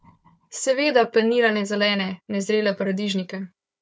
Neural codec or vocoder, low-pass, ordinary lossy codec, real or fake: codec, 16 kHz, 4 kbps, FunCodec, trained on Chinese and English, 50 frames a second; none; none; fake